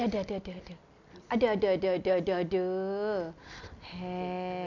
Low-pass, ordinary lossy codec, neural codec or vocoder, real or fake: 7.2 kHz; none; none; real